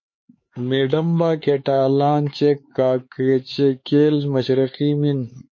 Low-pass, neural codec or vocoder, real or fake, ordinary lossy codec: 7.2 kHz; codec, 16 kHz, 4 kbps, X-Codec, HuBERT features, trained on LibriSpeech; fake; MP3, 32 kbps